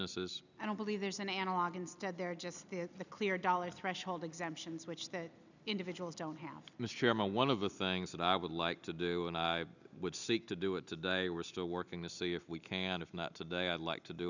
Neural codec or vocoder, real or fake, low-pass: none; real; 7.2 kHz